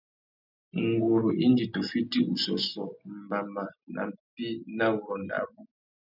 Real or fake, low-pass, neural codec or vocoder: real; 5.4 kHz; none